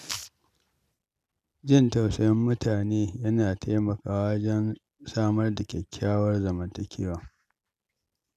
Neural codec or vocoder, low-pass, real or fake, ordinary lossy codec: none; 14.4 kHz; real; none